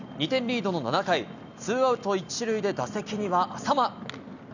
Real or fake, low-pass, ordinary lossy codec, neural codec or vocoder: real; 7.2 kHz; none; none